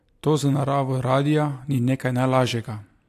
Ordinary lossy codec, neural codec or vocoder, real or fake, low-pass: AAC, 64 kbps; none; real; 14.4 kHz